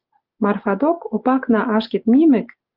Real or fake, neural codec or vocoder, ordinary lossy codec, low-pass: real; none; Opus, 24 kbps; 5.4 kHz